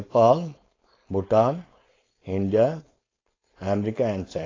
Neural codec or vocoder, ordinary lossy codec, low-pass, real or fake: codec, 16 kHz, 4.8 kbps, FACodec; AAC, 32 kbps; 7.2 kHz; fake